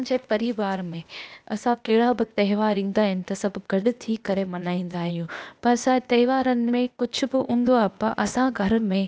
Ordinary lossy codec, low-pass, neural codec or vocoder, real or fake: none; none; codec, 16 kHz, 0.8 kbps, ZipCodec; fake